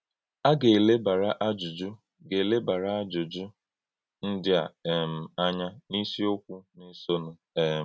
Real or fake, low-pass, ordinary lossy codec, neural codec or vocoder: real; none; none; none